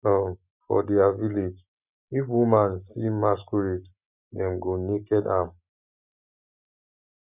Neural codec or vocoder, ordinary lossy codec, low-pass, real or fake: none; none; 3.6 kHz; real